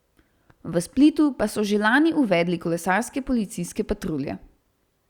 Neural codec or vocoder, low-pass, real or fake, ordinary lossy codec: none; 19.8 kHz; real; Opus, 64 kbps